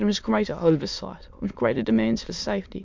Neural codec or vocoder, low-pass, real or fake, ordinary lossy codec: autoencoder, 22.05 kHz, a latent of 192 numbers a frame, VITS, trained on many speakers; 7.2 kHz; fake; MP3, 64 kbps